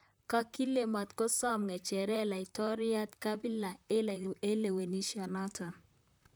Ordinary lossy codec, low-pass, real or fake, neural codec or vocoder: none; none; fake; vocoder, 44.1 kHz, 128 mel bands, Pupu-Vocoder